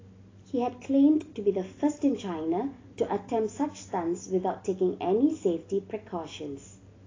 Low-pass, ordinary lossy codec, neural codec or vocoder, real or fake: 7.2 kHz; AAC, 32 kbps; none; real